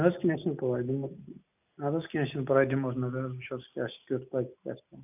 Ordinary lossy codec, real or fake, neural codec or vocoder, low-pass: none; real; none; 3.6 kHz